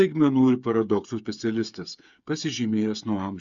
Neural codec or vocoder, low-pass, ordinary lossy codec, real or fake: codec, 16 kHz, 8 kbps, FreqCodec, smaller model; 7.2 kHz; Opus, 64 kbps; fake